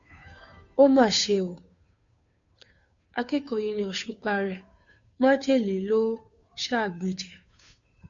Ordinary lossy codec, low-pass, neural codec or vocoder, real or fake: MP3, 64 kbps; 7.2 kHz; codec, 16 kHz, 2 kbps, FunCodec, trained on Chinese and English, 25 frames a second; fake